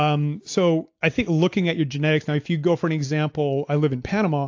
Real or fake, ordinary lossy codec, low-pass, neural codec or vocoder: real; AAC, 48 kbps; 7.2 kHz; none